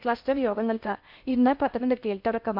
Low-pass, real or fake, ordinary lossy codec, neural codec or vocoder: 5.4 kHz; fake; none; codec, 16 kHz in and 24 kHz out, 0.6 kbps, FocalCodec, streaming, 2048 codes